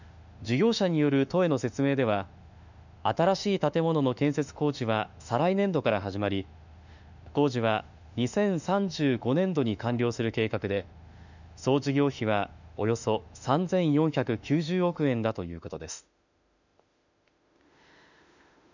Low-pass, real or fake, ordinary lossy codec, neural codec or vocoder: 7.2 kHz; fake; none; autoencoder, 48 kHz, 32 numbers a frame, DAC-VAE, trained on Japanese speech